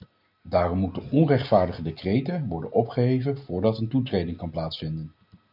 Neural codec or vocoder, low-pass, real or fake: none; 5.4 kHz; real